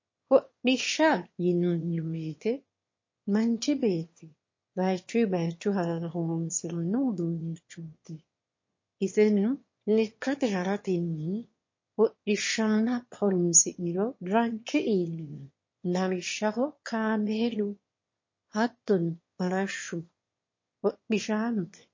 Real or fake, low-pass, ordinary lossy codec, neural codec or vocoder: fake; 7.2 kHz; MP3, 32 kbps; autoencoder, 22.05 kHz, a latent of 192 numbers a frame, VITS, trained on one speaker